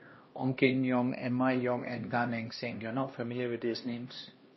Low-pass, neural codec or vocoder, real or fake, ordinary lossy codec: 7.2 kHz; codec, 16 kHz, 1 kbps, X-Codec, HuBERT features, trained on LibriSpeech; fake; MP3, 24 kbps